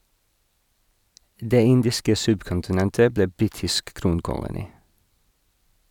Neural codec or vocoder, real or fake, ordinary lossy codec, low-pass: none; real; none; 19.8 kHz